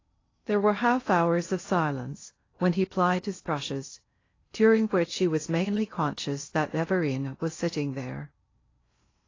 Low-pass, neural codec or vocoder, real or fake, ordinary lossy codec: 7.2 kHz; codec, 16 kHz in and 24 kHz out, 0.6 kbps, FocalCodec, streaming, 2048 codes; fake; AAC, 32 kbps